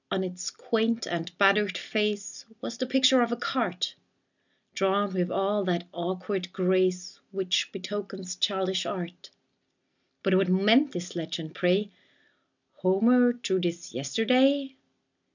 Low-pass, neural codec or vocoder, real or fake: 7.2 kHz; none; real